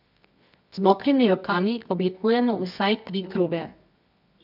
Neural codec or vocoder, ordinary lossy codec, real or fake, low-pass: codec, 24 kHz, 0.9 kbps, WavTokenizer, medium music audio release; none; fake; 5.4 kHz